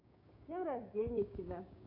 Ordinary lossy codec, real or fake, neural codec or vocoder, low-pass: AAC, 32 kbps; fake; codec, 16 kHz, 6 kbps, DAC; 5.4 kHz